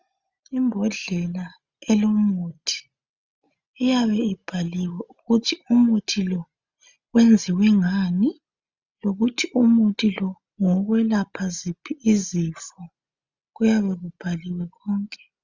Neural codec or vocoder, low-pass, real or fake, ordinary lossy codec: none; 7.2 kHz; real; Opus, 64 kbps